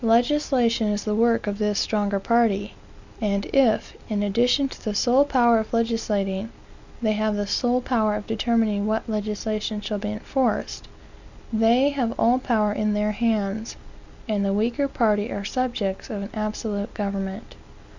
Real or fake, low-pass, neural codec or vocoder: real; 7.2 kHz; none